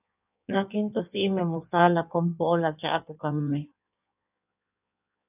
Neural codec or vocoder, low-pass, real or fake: codec, 16 kHz in and 24 kHz out, 1.1 kbps, FireRedTTS-2 codec; 3.6 kHz; fake